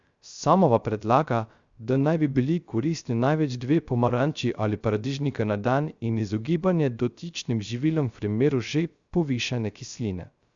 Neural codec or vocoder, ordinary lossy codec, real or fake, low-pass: codec, 16 kHz, 0.3 kbps, FocalCodec; Opus, 64 kbps; fake; 7.2 kHz